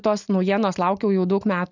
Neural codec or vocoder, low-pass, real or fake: none; 7.2 kHz; real